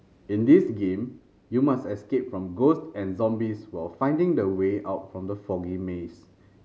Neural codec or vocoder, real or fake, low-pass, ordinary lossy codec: none; real; none; none